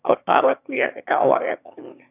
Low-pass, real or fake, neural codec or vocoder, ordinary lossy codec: 3.6 kHz; fake; autoencoder, 22.05 kHz, a latent of 192 numbers a frame, VITS, trained on one speaker; none